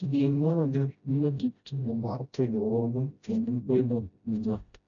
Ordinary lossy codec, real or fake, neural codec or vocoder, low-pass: none; fake; codec, 16 kHz, 0.5 kbps, FreqCodec, smaller model; 7.2 kHz